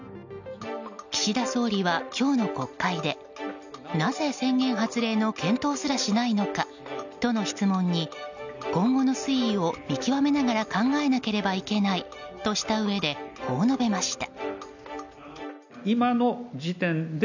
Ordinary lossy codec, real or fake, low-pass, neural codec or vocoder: none; real; 7.2 kHz; none